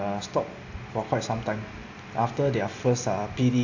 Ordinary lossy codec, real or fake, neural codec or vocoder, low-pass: AAC, 48 kbps; real; none; 7.2 kHz